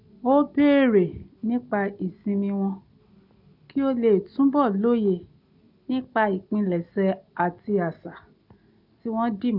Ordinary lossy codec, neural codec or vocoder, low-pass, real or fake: none; none; 5.4 kHz; real